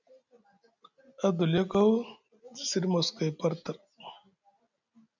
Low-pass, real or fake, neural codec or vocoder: 7.2 kHz; real; none